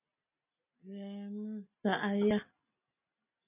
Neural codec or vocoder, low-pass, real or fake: none; 3.6 kHz; real